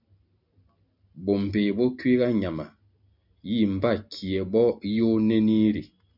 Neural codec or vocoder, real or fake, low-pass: none; real; 5.4 kHz